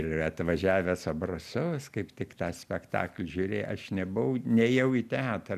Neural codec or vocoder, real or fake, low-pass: none; real; 14.4 kHz